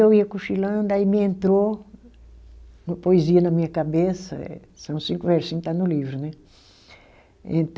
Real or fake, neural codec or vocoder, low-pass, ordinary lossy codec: real; none; none; none